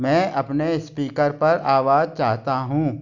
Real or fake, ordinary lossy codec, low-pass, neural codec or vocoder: real; none; 7.2 kHz; none